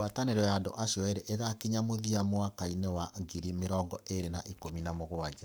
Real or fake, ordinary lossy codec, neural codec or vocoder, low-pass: fake; none; codec, 44.1 kHz, 7.8 kbps, Pupu-Codec; none